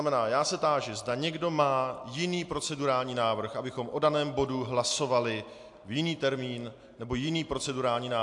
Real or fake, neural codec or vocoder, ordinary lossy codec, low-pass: real; none; AAC, 64 kbps; 10.8 kHz